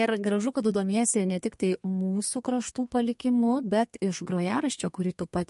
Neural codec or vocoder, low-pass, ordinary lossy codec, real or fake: codec, 32 kHz, 1.9 kbps, SNAC; 14.4 kHz; MP3, 48 kbps; fake